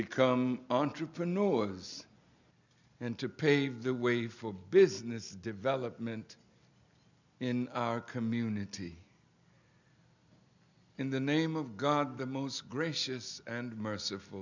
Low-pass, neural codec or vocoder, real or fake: 7.2 kHz; none; real